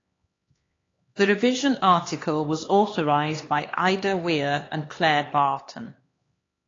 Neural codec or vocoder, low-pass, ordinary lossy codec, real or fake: codec, 16 kHz, 2 kbps, X-Codec, HuBERT features, trained on LibriSpeech; 7.2 kHz; AAC, 32 kbps; fake